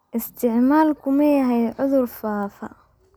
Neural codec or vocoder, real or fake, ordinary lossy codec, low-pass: none; real; none; none